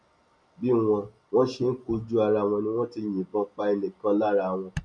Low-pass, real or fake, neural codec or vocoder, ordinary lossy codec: 9.9 kHz; real; none; none